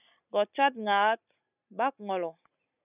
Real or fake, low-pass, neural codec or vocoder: real; 3.6 kHz; none